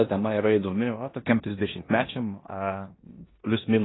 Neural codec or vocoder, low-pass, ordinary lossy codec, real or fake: codec, 16 kHz in and 24 kHz out, 0.9 kbps, LongCat-Audio-Codec, four codebook decoder; 7.2 kHz; AAC, 16 kbps; fake